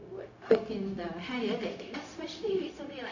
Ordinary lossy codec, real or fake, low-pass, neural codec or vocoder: none; fake; 7.2 kHz; codec, 16 kHz, 0.4 kbps, LongCat-Audio-Codec